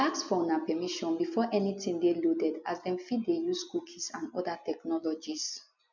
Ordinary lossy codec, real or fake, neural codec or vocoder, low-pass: none; real; none; 7.2 kHz